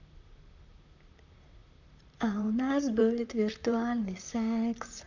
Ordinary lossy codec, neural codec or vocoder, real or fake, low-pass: none; vocoder, 44.1 kHz, 128 mel bands, Pupu-Vocoder; fake; 7.2 kHz